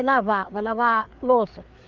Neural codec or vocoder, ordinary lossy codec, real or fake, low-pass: codec, 16 kHz, 4 kbps, FreqCodec, larger model; Opus, 32 kbps; fake; 7.2 kHz